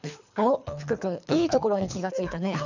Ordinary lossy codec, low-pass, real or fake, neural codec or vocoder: none; 7.2 kHz; fake; codec, 24 kHz, 3 kbps, HILCodec